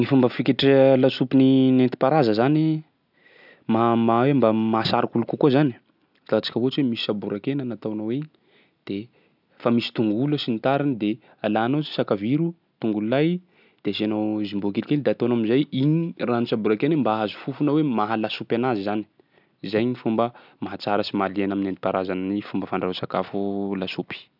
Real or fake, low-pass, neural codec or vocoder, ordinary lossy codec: real; 5.4 kHz; none; none